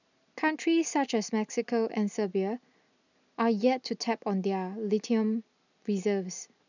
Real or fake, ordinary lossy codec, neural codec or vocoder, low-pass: real; none; none; 7.2 kHz